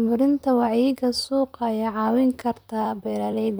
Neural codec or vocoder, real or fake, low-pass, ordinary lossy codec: vocoder, 44.1 kHz, 128 mel bands, Pupu-Vocoder; fake; none; none